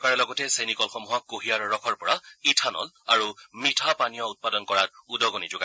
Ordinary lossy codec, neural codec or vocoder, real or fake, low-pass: none; none; real; none